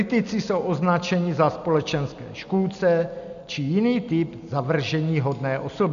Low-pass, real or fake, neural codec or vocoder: 7.2 kHz; real; none